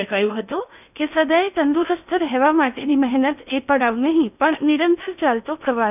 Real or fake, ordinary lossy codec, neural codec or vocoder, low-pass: fake; none; codec, 16 kHz in and 24 kHz out, 0.8 kbps, FocalCodec, streaming, 65536 codes; 3.6 kHz